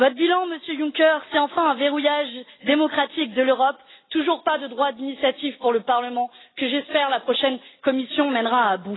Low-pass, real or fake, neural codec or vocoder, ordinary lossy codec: 7.2 kHz; real; none; AAC, 16 kbps